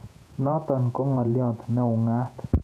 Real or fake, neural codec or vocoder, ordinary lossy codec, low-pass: fake; vocoder, 48 kHz, 128 mel bands, Vocos; MP3, 96 kbps; 14.4 kHz